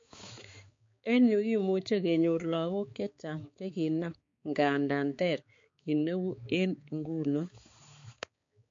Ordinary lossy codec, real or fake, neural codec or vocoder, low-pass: MP3, 48 kbps; fake; codec, 16 kHz, 4 kbps, X-Codec, HuBERT features, trained on balanced general audio; 7.2 kHz